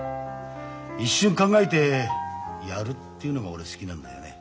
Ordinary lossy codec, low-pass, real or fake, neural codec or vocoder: none; none; real; none